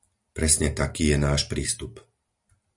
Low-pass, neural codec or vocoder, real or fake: 10.8 kHz; none; real